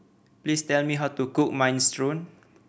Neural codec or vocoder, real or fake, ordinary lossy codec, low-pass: none; real; none; none